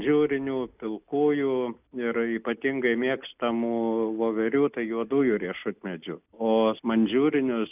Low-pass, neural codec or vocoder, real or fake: 3.6 kHz; none; real